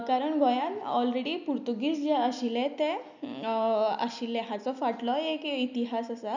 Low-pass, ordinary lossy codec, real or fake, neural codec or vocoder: 7.2 kHz; none; real; none